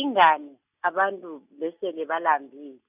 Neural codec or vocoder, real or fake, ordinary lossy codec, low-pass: none; real; none; 3.6 kHz